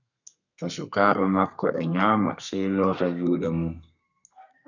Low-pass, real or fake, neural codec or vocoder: 7.2 kHz; fake; codec, 32 kHz, 1.9 kbps, SNAC